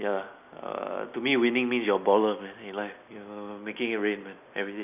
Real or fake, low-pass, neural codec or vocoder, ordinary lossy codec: real; 3.6 kHz; none; none